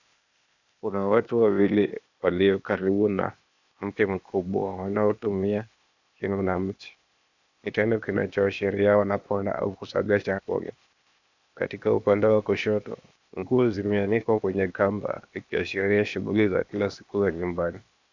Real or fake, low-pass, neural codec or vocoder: fake; 7.2 kHz; codec, 16 kHz, 0.8 kbps, ZipCodec